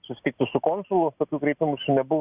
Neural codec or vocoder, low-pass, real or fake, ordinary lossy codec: none; 3.6 kHz; real; Opus, 64 kbps